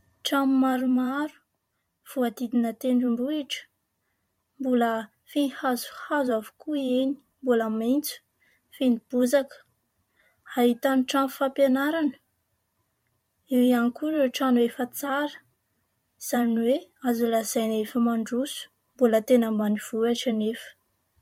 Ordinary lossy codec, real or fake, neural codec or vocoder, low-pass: MP3, 64 kbps; fake; vocoder, 44.1 kHz, 128 mel bands every 512 samples, BigVGAN v2; 19.8 kHz